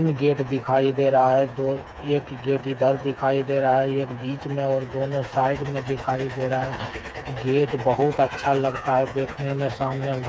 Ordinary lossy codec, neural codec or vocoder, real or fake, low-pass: none; codec, 16 kHz, 4 kbps, FreqCodec, smaller model; fake; none